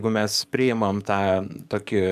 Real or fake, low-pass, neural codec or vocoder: fake; 14.4 kHz; codec, 44.1 kHz, 7.8 kbps, DAC